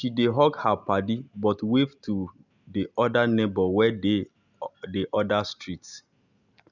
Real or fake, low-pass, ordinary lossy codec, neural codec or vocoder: real; 7.2 kHz; none; none